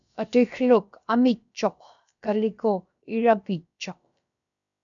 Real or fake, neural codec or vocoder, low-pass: fake; codec, 16 kHz, about 1 kbps, DyCAST, with the encoder's durations; 7.2 kHz